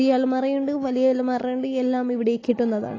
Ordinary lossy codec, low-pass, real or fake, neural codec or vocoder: AAC, 32 kbps; 7.2 kHz; real; none